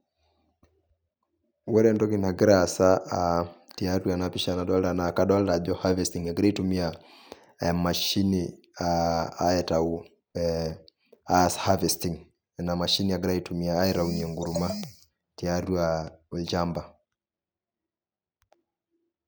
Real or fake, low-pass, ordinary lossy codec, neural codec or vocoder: real; none; none; none